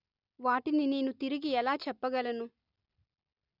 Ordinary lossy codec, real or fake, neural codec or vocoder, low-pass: none; real; none; 5.4 kHz